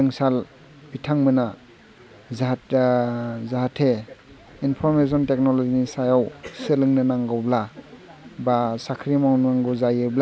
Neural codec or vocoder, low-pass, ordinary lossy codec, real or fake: none; none; none; real